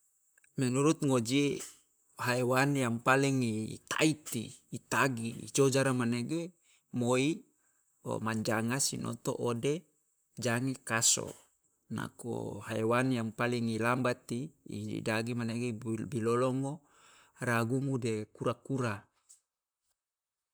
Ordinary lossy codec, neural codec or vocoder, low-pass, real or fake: none; vocoder, 44.1 kHz, 128 mel bands, Pupu-Vocoder; none; fake